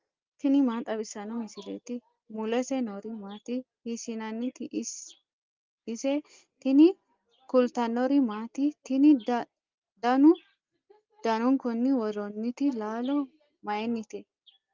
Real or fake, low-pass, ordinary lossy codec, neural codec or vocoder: real; 7.2 kHz; Opus, 32 kbps; none